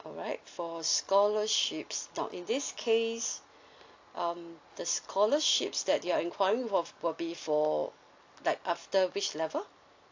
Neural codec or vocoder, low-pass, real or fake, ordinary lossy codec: none; 7.2 kHz; real; AAC, 48 kbps